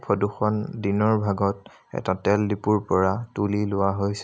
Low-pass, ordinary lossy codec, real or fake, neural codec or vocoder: none; none; real; none